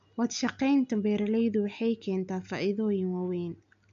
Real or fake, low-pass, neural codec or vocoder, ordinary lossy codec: real; 7.2 kHz; none; AAC, 64 kbps